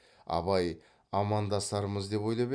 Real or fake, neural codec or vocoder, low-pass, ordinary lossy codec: real; none; 9.9 kHz; none